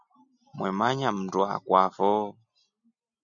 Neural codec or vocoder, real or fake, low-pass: none; real; 7.2 kHz